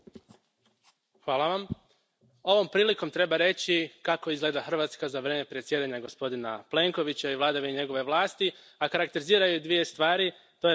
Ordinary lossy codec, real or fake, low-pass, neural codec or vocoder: none; real; none; none